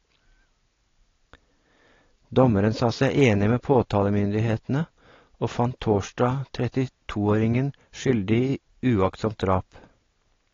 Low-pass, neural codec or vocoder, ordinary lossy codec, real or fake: 7.2 kHz; none; AAC, 32 kbps; real